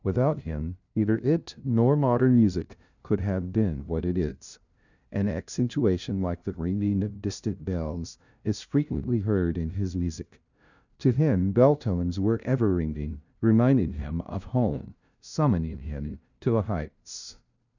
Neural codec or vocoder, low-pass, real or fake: codec, 16 kHz, 0.5 kbps, FunCodec, trained on LibriTTS, 25 frames a second; 7.2 kHz; fake